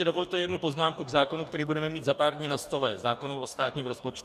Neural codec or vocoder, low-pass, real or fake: codec, 44.1 kHz, 2.6 kbps, DAC; 14.4 kHz; fake